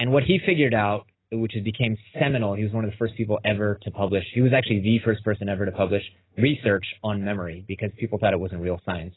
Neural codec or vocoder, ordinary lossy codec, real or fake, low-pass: none; AAC, 16 kbps; real; 7.2 kHz